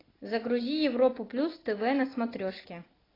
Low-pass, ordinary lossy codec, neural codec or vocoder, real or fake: 5.4 kHz; AAC, 24 kbps; none; real